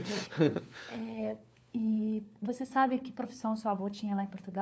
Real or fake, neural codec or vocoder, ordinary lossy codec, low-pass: fake; codec, 16 kHz, 4 kbps, FunCodec, trained on LibriTTS, 50 frames a second; none; none